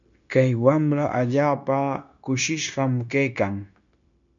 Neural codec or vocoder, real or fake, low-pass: codec, 16 kHz, 0.9 kbps, LongCat-Audio-Codec; fake; 7.2 kHz